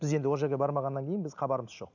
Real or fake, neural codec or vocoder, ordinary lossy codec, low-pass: real; none; none; 7.2 kHz